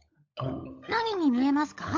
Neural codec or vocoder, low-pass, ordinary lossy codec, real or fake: codec, 16 kHz, 16 kbps, FunCodec, trained on LibriTTS, 50 frames a second; 7.2 kHz; MP3, 48 kbps; fake